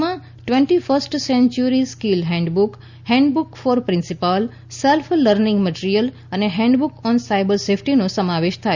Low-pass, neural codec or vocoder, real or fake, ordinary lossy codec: 7.2 kHz; none; real; Opus, 64 kbps